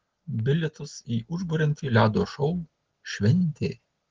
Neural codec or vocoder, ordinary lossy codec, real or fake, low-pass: none; Opus, 16 kbps; real; 7.2 kHz